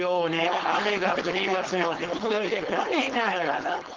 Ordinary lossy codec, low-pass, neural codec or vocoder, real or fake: Opus, 16 kbps; 7.2 kHz; codec, 16 kHz, 4.8 kbps, FACodec; fake